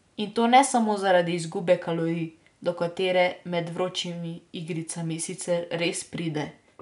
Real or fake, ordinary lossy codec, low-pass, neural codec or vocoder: real; none; 10.8 kHz; none